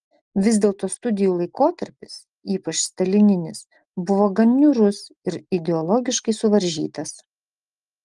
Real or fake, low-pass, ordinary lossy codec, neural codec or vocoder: real; 10.8 kHz; Opus, 24 kbps; none